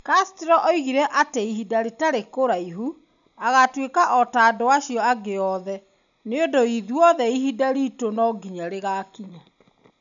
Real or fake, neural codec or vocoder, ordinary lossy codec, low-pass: real; none; none; 7.2 kHz